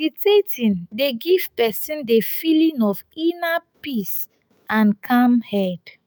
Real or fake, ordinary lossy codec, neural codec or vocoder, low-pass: fake; none; autoencoder, 48 kHz, 128 numbers a frame, DAC-VAE, trained on Japanese speech; none